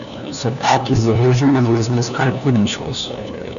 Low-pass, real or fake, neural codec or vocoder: 7.2 kHz; fake; codec, 16 kHz, 1 kbps, FunCodec, trained on LibriTTS, 50 frames a second